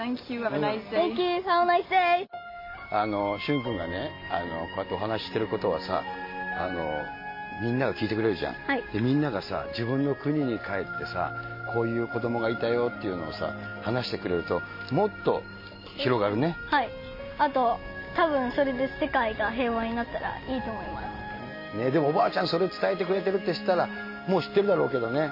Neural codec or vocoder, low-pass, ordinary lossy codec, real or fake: none; 5.4 kHz; MP3, 24 kbps; real